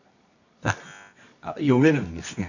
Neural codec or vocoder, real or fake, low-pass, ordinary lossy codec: codec, 24 kHz, 0.9 kbps, WavTokenizer, medium music audio release; fake; 7.2 kHz; none